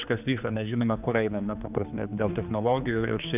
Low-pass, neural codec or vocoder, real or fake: 3.6 kHz; codec, 16 kHz, 2 kbps, X-Codec, HuBERT features, trained on general audio; fake